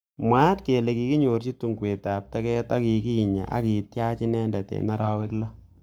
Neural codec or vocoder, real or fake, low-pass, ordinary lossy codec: codec, 44.1 kHz, 7.8 kbps, Pupu-Codec; fake; none; none